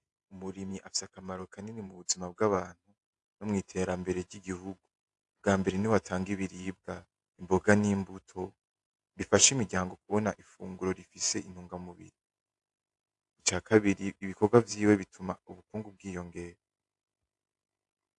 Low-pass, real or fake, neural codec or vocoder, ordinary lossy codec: 10.8 kHz; fake; vocoder, 48 kHz, 128 mel bands, Vocos; AAC, 64 kbps